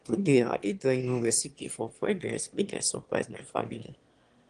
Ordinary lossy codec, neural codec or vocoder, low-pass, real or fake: Opus, 24 kbps; autoencoder, 22.05 kHz, a latent of 192 numbers a frame, VITS, trained on one speaker; 9.9 kHz; fake